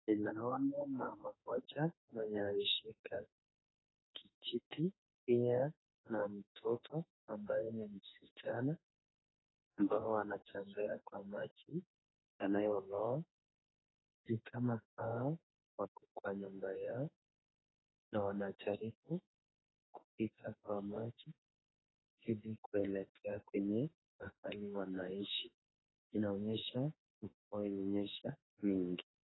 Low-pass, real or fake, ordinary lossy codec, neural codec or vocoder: 7.2 kHz; fake; AAC, 16 kbps; autoencoder, 48 kHz, 32 numbers a frame, DAC-VAE, trained on Japanese speech